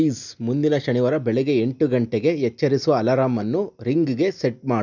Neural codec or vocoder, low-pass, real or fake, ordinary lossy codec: none; 7.2 kHz; real; none